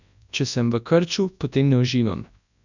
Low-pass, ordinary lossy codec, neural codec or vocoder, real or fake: 7.2 kHz; none; codec, 24 kHz, 0.9 kbps, WavTokenizer, large speech release; fake